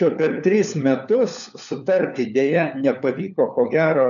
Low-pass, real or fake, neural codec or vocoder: 7.2 kHz; fake; codec, 16 kHz, 4 kbps, FunCodec, trained on LibriTTS, 50 frames a second